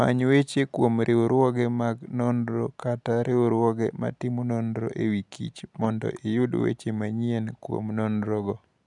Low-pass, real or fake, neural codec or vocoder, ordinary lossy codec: 10.8 kHz; real; none; none